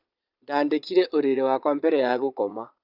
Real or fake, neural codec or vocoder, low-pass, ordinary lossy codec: fake; vocoder, 22.05 kHz, 80 mel bands, Vocos; 5.4 kHz; none